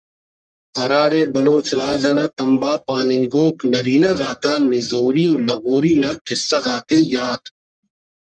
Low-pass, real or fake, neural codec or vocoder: 9.9 kHz; fake; codec, 44.1 kHz, 1.7 kbps, Pupu-Codec